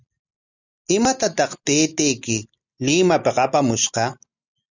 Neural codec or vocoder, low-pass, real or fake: none; 7.2 kHz; real